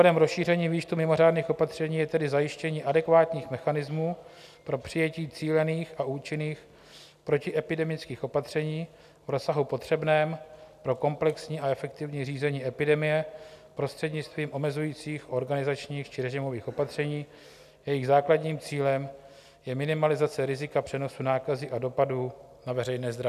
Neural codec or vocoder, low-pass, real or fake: none; 14.4 kHz; real